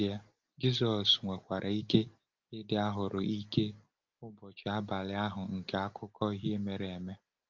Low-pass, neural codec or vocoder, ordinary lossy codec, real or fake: 7.2 kHz; none; Opus, 24 kbps; real